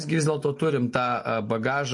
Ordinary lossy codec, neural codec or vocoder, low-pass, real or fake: MP3, 48 kbps; none; 10.8 kHz; real